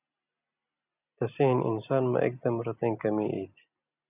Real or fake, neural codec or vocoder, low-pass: real; none; 3.6 kHz